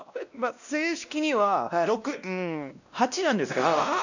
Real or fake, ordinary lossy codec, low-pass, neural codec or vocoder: fake; none; 7.2 kHz; codec, 16 kHz, 1 kbps, X-Codec, WavLM features, trained on Multilingual LibriSpeech